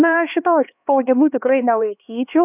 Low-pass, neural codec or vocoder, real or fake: 3.6 kHz; codec, 16 kHz, 2 kbps, X-Codec, HuBERT features, trained on LibriSpeech; fake